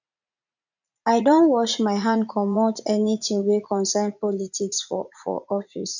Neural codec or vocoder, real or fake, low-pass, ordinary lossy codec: vocoder, 44.1 kHz, 80 mel bands, Vocos; fake; 7.2 kHz; none